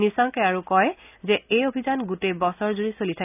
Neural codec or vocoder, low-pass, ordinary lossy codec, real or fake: none; 3.6 kHz; none; real